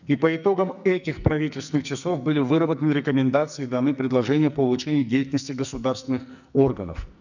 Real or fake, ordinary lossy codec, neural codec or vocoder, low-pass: fake; none; codec, 44.1 kHz, 2.6 kbps, SNAC; 7.2 kHz